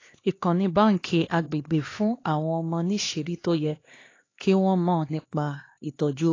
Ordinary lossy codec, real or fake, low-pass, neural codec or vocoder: AAC, 32 kbps; fake; 7.2 kHz; codec, 16 kHz, 2 kbps, X-Codec, HuBERT features, trained on LibriSpeech